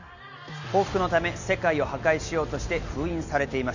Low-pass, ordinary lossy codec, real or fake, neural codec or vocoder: 7.2 kHz; none; real; none